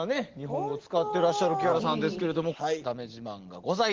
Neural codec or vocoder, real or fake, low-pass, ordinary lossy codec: none; real; 7.2 kHz; Opus, 16 kbps